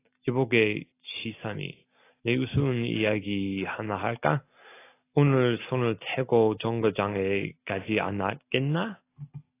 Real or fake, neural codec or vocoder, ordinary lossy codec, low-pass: real; none; AAC, 24 kbps; 3.6 kHz